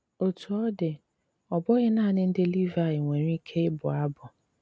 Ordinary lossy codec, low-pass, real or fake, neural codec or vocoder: none; none; real; none